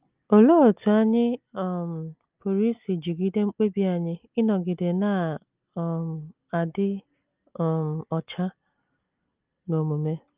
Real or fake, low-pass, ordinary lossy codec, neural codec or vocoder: real; 3.6 kHz; Opus, 24 kbps; none